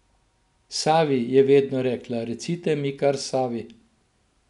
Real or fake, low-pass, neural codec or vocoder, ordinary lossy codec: real; 10.8 kHz; none; none